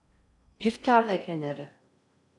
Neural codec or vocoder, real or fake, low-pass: codec, 16 kHz in and 24 kHz out, 0.6 kbps, FocalCodec, streaming, 4096 codes; fake; 10.8 kHz